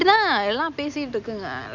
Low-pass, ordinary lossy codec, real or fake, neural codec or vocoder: 7.2 kHz; none; real; none